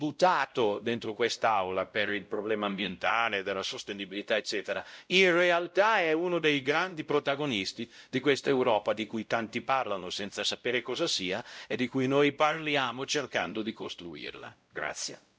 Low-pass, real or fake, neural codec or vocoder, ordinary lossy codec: none; fake; codec, 16 kHz, 0.5 kbps, X-Codec, WavLM features, trained on Multilingual LibriSpeech; none